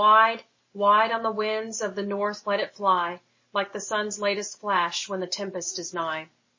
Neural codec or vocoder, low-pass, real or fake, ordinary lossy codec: none; 7.2 kHz; real; MP3, 32 kbps